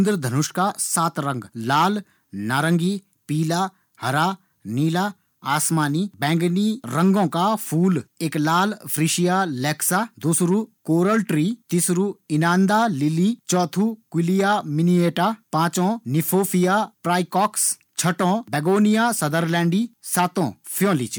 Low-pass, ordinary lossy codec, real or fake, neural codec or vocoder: none; none; real; none